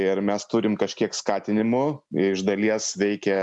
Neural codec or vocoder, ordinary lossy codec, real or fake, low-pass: none; MP3, 96 kbps; real; 10.8 kHz